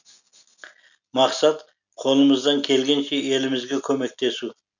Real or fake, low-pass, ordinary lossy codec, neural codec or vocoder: real; 7.2 kHz; none; none